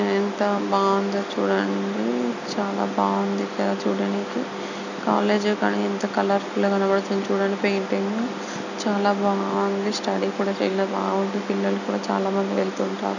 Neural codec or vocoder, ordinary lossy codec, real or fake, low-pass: none; none; real; 7.2 kHz